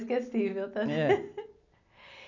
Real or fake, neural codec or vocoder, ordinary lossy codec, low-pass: real; none; none; 7.2 kHz